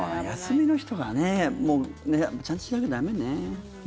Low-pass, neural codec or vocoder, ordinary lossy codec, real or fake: none; none; none; real